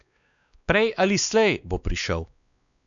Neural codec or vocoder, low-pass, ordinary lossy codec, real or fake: codec, 16 kHz, 2 kbps, X-Codec, WavLM features, trained on Multilingual LibriSpeech; 7.2 kHz; none; fake